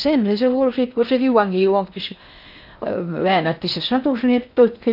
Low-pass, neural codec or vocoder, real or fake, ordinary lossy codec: 5.4 kHz; codec, 16 kHz in and 24 kHz out, 0.8 kbps, FocalCodec, streaming, 65536 codes; fake; none